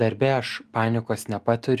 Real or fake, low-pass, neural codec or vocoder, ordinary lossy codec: real; 14.4 kHz; none; Opus, 32 kbps